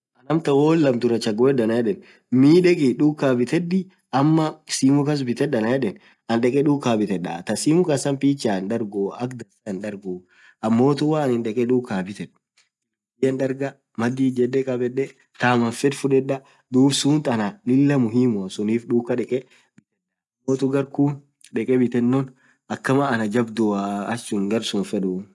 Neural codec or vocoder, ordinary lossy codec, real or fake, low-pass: none; none; real; none